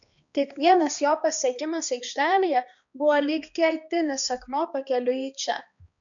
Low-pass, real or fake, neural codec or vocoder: 7.2 kHz; fake; codec, 16 kHz, 2 kbps, X-Codec, HuBERT features, trained on balanced general audio